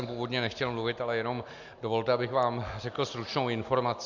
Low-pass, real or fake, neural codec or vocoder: 7.2 kHz; real; none